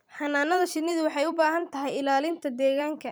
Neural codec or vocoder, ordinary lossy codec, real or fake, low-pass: vocoder, 44.1 kHz, 128 mel bands every 256 samples, BigVGAN v2; none; fake; none